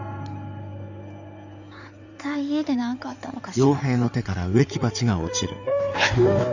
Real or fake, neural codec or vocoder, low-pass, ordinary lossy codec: fake; codec, 16 kHz in and 24 kHz out, 2.2 kbps, FireRedTTS-2 codec; 7.2 kHz; none